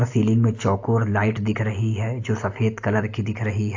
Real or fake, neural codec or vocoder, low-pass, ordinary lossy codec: real; none; 7.2 kHz; AAC, 48 kbps